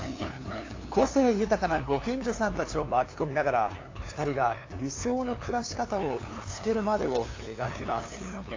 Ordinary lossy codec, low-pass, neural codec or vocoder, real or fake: AAC, 32 kbps; 7.2 kHz; codec, 16 kHz, 2 kbps, FunCodec, trained on LibriTTS, 25 frames a second; fake